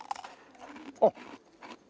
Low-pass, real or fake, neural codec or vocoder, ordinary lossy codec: none; real; none; none